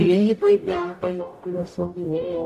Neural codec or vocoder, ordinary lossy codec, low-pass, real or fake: codec, 44.1 kHz, 0.9 kbps, DAC; AAC, 64 kbps; 14.4 kHz; fake